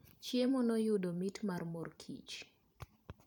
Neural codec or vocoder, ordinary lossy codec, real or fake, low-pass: vocoder, 44.1 kHz, 128 mel bands every 512 samples, BigVGAN v2; none; fake; 19.8 kHz